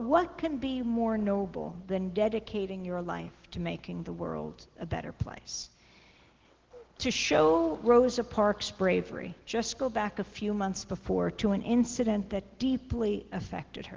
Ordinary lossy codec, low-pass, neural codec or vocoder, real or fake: Opus, 16 kbps; 7.2 kHz; none; real